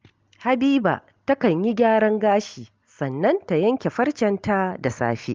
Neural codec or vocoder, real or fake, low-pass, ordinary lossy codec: none; real; 7.2 kHz; Opus, 32 kbps